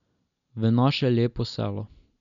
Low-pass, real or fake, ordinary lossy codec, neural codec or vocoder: 7.2 kHz; real; none; none